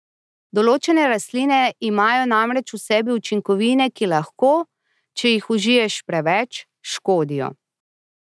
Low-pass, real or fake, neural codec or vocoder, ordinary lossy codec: none; real; none; none